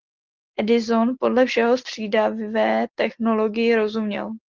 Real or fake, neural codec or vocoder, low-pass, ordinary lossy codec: real; none; 7.2 kHz; Opus, 16 kbps